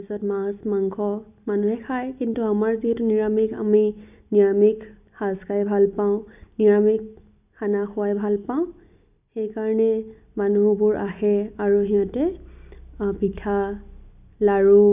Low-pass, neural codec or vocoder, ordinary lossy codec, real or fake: 3.6 kHz; none; none; real